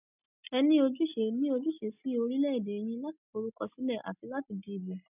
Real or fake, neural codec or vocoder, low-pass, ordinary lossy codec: real; none; 3.6 kHz; none